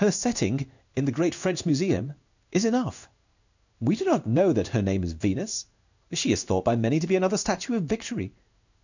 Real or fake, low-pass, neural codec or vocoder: fake; 7.2 kHz; codec, 16 kHz in and 24 kHz out, 1 kbps, XY-Tokenizer